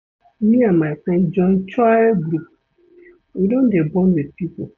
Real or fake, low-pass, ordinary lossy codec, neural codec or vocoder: real; 7.2 kHz; none; none